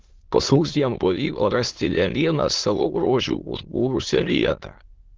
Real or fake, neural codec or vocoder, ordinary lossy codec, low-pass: fake; autoencoder, 22.05 kHz, a latent of 192 numbers a frame, VITS, trained on many speakers; Opus, 16 kbps; 7.2 kHz